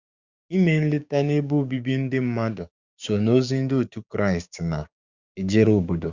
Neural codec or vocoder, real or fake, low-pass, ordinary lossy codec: codec, 16 kHz, 6 kbps, DAC; fake; 7.2 kHz; Opus, 64 kbps